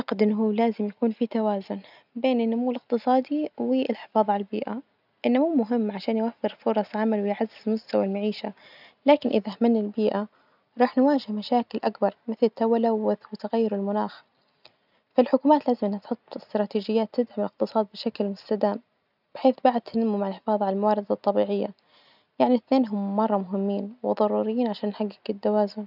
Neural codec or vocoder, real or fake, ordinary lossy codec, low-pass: none; real; none; 5.4 kHz